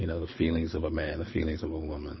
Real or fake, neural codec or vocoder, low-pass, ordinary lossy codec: fake; codec, 24 kHz, 6 kbps, HILCodec; 7.2 kHz; MP3, 24 kbps